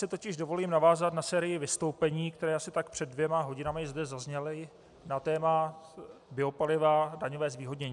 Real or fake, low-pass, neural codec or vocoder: real; 10.8 kHz; none